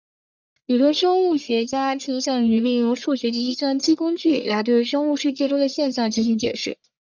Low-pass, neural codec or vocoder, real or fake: 7.2 kHz; codec, 44.1 kHz, 1.7 kbps, Pupu-Codec; fake